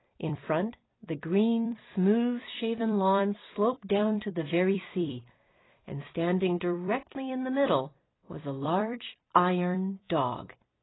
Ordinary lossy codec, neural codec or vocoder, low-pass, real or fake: AAC, 16 kbps; vocoder, 44.1 kHz, 128 mel bands every 256 samples, BigVGAN v2; 7.2 kHz; fake